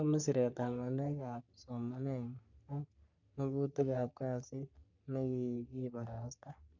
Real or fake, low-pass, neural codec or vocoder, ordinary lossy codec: fake; 7.2 kHz; codec, 44.1 kHz, 3.4 kbps, Pupu-Codec; none